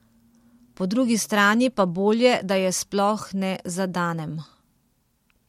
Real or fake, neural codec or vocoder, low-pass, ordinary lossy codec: real; none; 19.8 kHz; MP3, 64 kbps